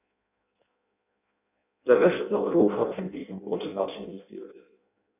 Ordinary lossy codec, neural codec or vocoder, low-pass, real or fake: none; codec, 16 kHz in and 24 kHz out, 0.6 kbps, FireRedTTS-2 codec; 3.6 kHz; fake